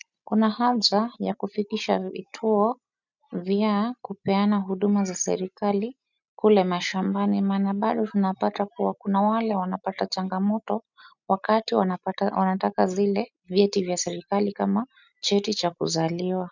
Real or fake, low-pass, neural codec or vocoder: real; 7.2 kHz; none